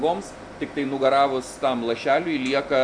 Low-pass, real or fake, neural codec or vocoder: 9.9 kHz; real; none